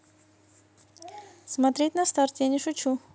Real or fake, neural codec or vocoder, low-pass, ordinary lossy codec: real; none; none; none